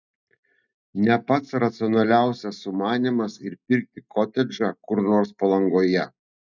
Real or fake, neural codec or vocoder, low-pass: real; none; 7.2 kHz